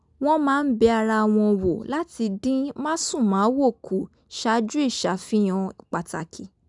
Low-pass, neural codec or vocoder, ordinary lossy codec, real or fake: 10.8 kHz; none; none; real